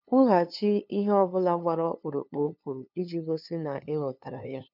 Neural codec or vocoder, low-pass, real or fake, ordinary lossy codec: codec, 16 kHz, 2 kbps, FunCodec, trained on LibriTTS, 25 frames a second; 5.4 kHz; fake; none